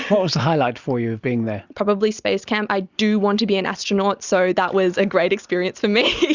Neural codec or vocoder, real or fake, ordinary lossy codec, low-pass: none; real; Opus, 64 kbps; 7.2 kHz